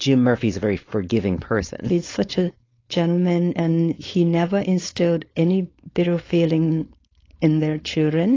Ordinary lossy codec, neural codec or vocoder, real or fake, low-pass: AAC, 32 kbps; codec, 16 kHz, 4.8 kbps, FACodec; fake; 7.2 kHz